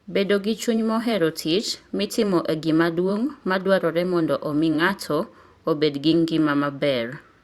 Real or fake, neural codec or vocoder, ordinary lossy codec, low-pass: fake; vocoder, 44.1 kHz, 128 mel bands every 512 samples, BigVGAN v2; Opus, 64 kbps; 19.8 kHz